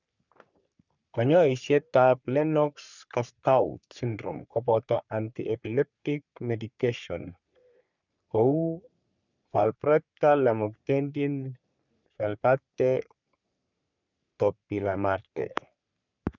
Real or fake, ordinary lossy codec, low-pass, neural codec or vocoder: fake; none; 7.2 kHz; codec, 44.1 kHz, 3.4 kbps, Pupu-Codec